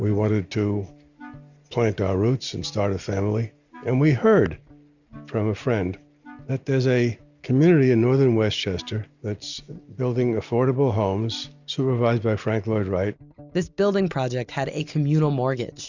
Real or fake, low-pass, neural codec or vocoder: real; 7.2 kHz; none